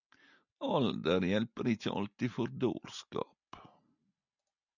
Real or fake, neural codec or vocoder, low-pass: real; none; 7.2 kHz